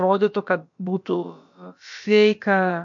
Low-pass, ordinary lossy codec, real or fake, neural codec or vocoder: 7.2 kHz; MP3, 48 kbps; fake; codec, 16 kHz, about 1 kbps, DyCAST, with the encoder's durations